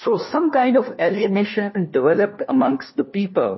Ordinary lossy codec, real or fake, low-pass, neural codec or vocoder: MP3, 24 kbps; fake; 7.2 kHz; codec, 16 kHz, 1 kbps, FunCodec, trained on LibriTTS, 50 frames a second